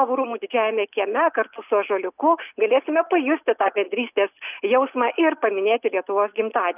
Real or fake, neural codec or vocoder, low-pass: real; none; 3.6 kHz